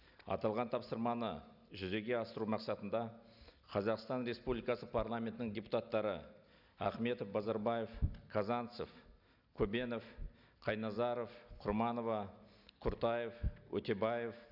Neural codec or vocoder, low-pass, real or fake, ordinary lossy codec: none; 5.4 kHz; real; none